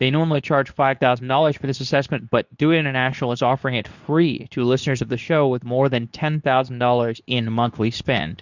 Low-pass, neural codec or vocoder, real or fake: 7.2 kHz; codec, 24 kHz, 0.9 kbps, WavTokenizer, medium speech release version 2; fake